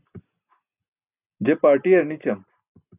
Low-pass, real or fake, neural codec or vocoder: 3.6 kHz; real; none